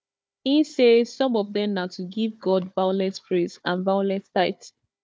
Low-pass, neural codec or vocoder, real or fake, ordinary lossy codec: none; codec, 16 kHz, 4 kbps, FunCodec, trained on Chinese and English, 50 frames a second; fake; none